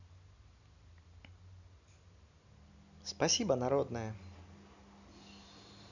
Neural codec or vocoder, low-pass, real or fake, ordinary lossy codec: none; 7.2 kHz; real; none